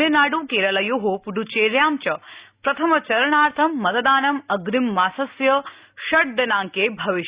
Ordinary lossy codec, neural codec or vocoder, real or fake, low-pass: Opus, 64 kbps; none; real; 3.6 kHz